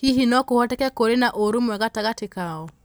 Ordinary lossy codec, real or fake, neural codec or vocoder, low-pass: none; real; none; none